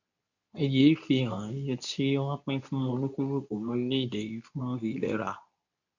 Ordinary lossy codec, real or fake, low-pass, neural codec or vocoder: none; fake; 7.2 kHz; codec, 24 kHz, 0.9 kbps, WavTokenizer, medium speech release version 2